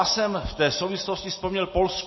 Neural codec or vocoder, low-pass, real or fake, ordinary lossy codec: none; 7.2 kHz; real; MP3, 24 kbps